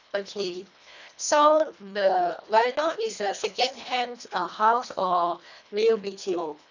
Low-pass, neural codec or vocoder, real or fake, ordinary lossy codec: 7.2 kHz; codec, 24 kHz, 1.5 kbps, HILCodec; fake; none